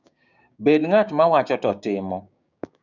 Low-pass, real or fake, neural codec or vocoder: 7.2 kHz; fake; codec, 16 kHz, 6 kbps, DAC